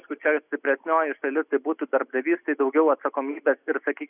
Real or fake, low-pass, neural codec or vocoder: real; 3.6 kHz; none